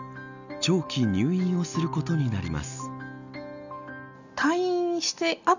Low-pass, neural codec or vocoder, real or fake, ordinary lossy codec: 7.2 kHz; none; real; none